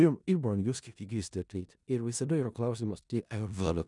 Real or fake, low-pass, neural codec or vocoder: fake; 10.8 kHz; codec, 16 kHz in and 24 kHz out, 0.4 kbps, LongCat-Audio-Codec, four codebook decoder